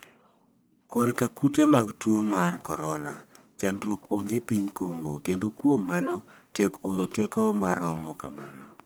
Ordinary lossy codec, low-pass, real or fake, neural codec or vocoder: none; none; fake; codec, 44.1 kHz, 1.7 kbps, Pupu-Codec